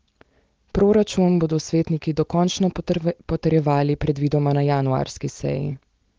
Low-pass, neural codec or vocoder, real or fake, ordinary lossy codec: 7.2 kHz; none; real; Opus, 16 kbps